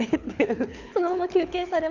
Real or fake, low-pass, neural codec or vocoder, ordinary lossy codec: fake; 7.2 kHz; codec, 24 kHz, 6 kbps, HILCodec; none